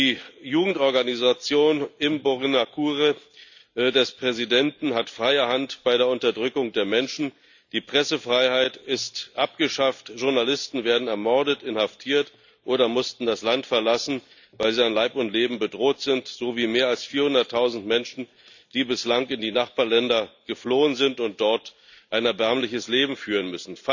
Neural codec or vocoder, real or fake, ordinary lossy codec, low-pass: none; real; none; 7.2 kHz